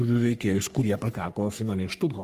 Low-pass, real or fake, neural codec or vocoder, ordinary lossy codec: 14.4 kHz; fake; codec, 44.1 kHz, 3.4 kbps, Pupu-Codec; Opus, 32 kbps